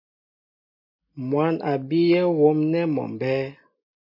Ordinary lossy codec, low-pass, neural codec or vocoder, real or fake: MP3, 32 kbps; 5.4 kHz; none; real